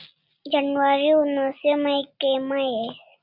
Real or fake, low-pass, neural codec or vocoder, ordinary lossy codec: real; 5.4 kHz; none; Opus, 64 kbps